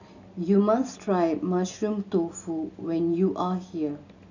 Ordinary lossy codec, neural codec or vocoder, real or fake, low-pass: none; none; real; 7.2 kHz